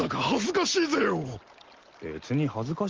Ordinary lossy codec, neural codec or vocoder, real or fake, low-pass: Opus, 16 kbps; none; real; 7.2 kHz